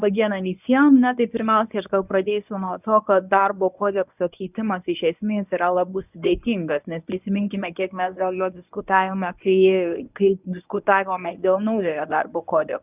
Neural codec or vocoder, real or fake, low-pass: codec, 24 kHz, 0.9 kbps, WavTokenizer, medium speech release version 1; fake; 3.6 kHz